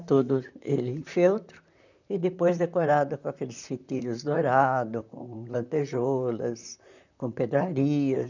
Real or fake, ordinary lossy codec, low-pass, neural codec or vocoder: fake; none; 7.2 kHz; vocoder, 44.1 kHz, 128 mel bands, Pupu-Vocoder